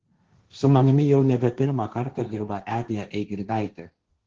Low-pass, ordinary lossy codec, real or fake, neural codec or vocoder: 7.2 kHz; Opus, 16 kbps; fake; codec, 16 kHz, 1.1 kbps, Voila-Tokenizer